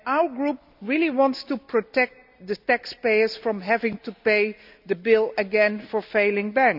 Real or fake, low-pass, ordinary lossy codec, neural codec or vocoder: real; 5.4 kHz; none; none